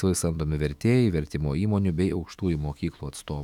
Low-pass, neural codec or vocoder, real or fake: 19.8 kHz; autoencoder, 48 kHz, 128 numbers a frame, DAC-VAE, trained on Japanese speech; fake